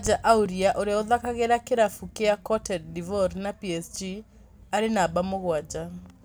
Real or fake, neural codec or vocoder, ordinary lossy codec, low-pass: real; none; none; none